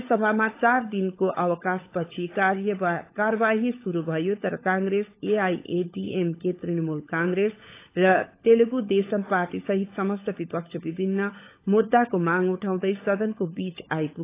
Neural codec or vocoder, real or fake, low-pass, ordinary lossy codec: codec, 16 kHz, 16 kbps, FunCodec, trained on LibriTTS, 50 frames a second; fake; 3.6 kHz; AAC, 24 kbps